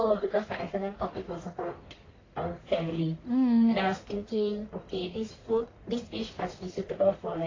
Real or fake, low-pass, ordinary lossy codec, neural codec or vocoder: fake; 7.2 kHz; AAC, 32 kbps; codec, 44.1 kHz, 1.7 kbps, Pupu-Codec